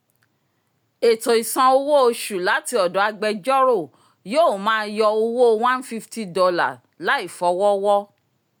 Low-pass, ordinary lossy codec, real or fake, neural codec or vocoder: none; none; real; none